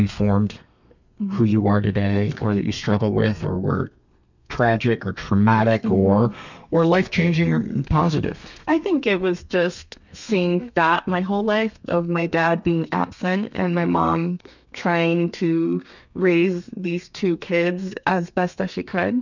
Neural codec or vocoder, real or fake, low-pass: codec, 32 kHz, 1.9 kbps, SNAC; fake; 7.2 kHz